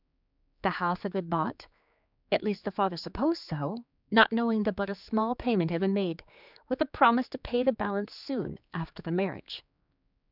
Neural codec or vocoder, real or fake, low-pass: codec, 16 kHz, 4 kbps, X-Codec, HuBERT features, trained on general audio; fake; 5.4 kHz